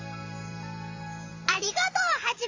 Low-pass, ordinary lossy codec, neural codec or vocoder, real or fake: 7.2 kHz; AAC, 48 kbps; vocoder, 44.1 kHz, 128 mel bands every 512 samples, BigVGAN v2; fake